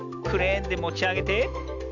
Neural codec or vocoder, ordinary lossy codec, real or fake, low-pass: none; none; real; 7.2 kHz